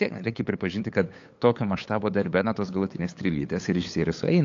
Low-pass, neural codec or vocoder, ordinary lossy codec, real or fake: 7.2 kHz; codec, 16 kHz, 8 kbps, FunCodec, trained on LibriTTS, 25 frames a second; AAC, 48 kbps; fake